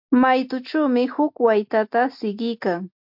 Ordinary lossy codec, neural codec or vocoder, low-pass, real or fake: MP3, 48 kbps; none; 5.4 kHz; real